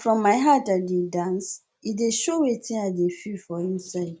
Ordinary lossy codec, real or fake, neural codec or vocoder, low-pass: none; real; none; none